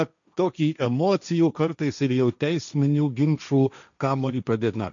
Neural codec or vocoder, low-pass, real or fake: codec, 16 kHz, 1.1 kbps, Voila-Tokenizer; 7.2 kHz; fake